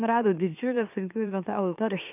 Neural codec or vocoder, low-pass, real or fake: autoencoder, 44.1 kHz, a latent of 192 numbers a frame, MeloTTS; 3.6 kHz; fake